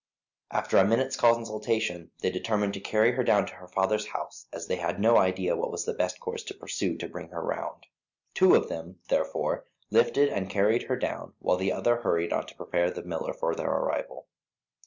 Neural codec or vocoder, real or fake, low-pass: none; real; 7.2 kHz